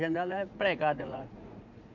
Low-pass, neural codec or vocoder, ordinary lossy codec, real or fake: 7.2 kHz; vocoder, 44.1 kHz, 80 mel bands, Vocos; none; fake